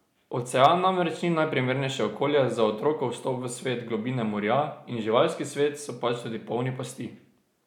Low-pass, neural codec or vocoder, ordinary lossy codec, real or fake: 19.8 kHz; none; none; real